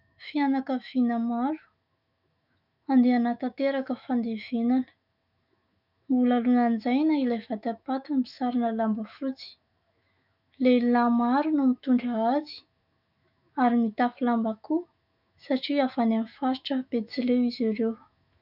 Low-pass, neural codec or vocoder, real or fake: 5.4 kHz; autoencoder, 48 kHz, 128 numbers a frame, DAC-VAE, trained on Japanese speech; fake